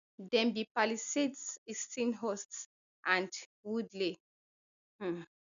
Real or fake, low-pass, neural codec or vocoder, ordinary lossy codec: real; 7.2 kHz; none; none